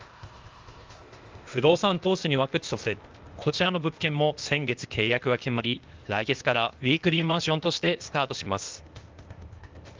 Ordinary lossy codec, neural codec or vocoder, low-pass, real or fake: Opus, 32 kbps; codec, 16 kHz, 0.8 kbps, ZipCodec; 7.2 kHz; fake